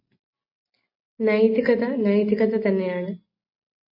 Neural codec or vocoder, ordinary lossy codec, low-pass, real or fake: none; MP3, 24 kbps; 5.4 kHz; real